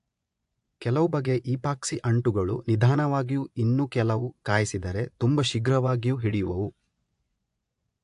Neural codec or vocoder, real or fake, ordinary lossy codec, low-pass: vocoder, 24 kHz, 100 mel bands, Vocos; fake; AAC, 64 kbps; 10.8 kHz